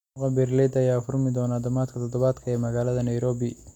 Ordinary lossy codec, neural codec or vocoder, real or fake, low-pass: none; none; real; 19.8 kHz